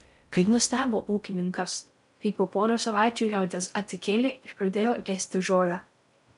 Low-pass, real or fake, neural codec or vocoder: 10.8 kHz; fake; codec, 16 kHz in and 24 kHz out, 0.6 kbps, FocalCodec, streaming, 4096 codes